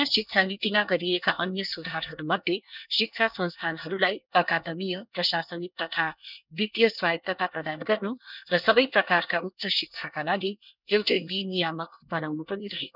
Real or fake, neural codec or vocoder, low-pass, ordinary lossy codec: fake; codec, 24 kHz, 1 kbps, SNAC; 5.4 kHz; none